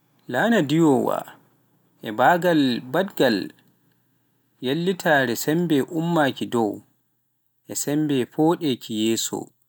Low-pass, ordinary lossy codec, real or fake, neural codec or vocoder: none; none; real; none